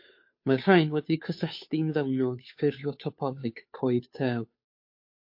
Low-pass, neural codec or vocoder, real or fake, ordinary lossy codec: 5.4 kHz; codec, 16 kHz, 2 kbps, FunCodec, trained on Chinese and English, 25 frames a second; fake; MP3, 32 kbps